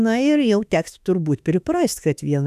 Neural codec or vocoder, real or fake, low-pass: autoencoder, 48 kHz, 32 numbers a frame, DAC-VAE, trained on Japanese speech; fake; 14.4 kHz